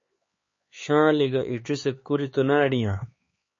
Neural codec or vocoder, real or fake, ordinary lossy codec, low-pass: codec, 16 kHz, 4 kbps, X-Codec, HuBERT features, trained on LibriSpeech; fake; MP3, 32 kbps; 7.2 kHz